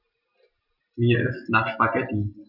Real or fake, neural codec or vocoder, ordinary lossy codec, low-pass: real; none; none; 5.4 kHz